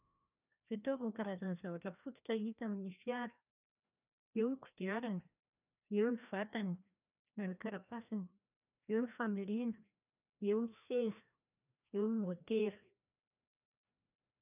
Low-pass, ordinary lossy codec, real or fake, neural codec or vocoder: 3.6 kHz; none; fake; codec, 16 kHz, 1 kbps, FreqCodec, larger model